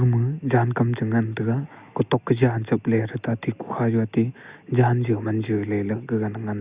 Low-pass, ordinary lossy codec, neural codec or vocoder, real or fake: 3.6 kHz; Opus, 64 kbps; none; real